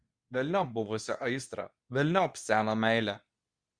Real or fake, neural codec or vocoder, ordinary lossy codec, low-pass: fake; codec, 24 kHz, 0.9 kbps, WavTokenizer, medium speech release version 2; Opus, 64 kbps; 9.9 kHz